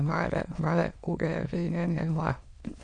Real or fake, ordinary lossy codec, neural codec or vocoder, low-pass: fake; none; autoencoder, 22.05 kHz, a latent of 192 numbers a frame, VITS, trained on many speakers; 9.9 kHz